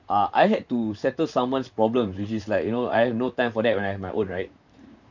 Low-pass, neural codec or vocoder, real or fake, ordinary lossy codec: 7.2 kHz; vocoder, 44.1 kHz, 128 mel bands, Pupu-Vocoder; fake; none